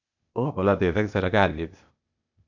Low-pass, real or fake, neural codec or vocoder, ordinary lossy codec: 7.2 kHz; fake; codec, 16 kHz, 0.8 kbps, ZipCodec; none